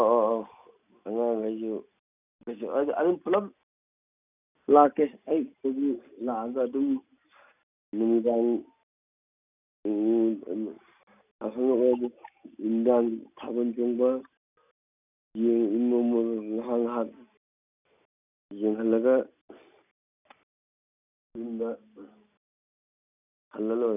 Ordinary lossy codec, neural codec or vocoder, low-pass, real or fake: none; none; 3.6 kHz; real